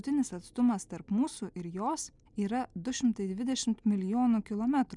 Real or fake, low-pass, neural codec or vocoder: real; 10.8 kHz; none